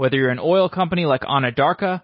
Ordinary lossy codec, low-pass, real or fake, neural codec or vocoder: MP3, 24 kbps; 7.2 kHz; real; none